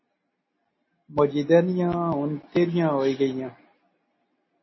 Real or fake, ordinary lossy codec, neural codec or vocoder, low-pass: real; MP3, 24 kbps; none; 7.2 kHz